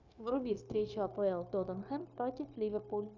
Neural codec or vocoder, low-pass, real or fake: codec, 16 kHz, 0.9 kbps, LongCat-Audio-Codec; 7.2 kHz; fake